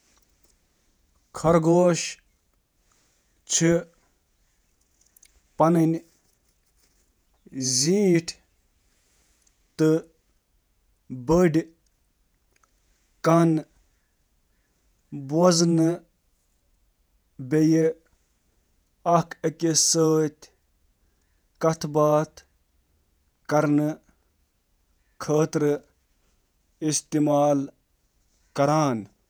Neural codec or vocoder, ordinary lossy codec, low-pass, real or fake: vocoder, 48 kHz, 128 mel bands, Vocos; none; none; fake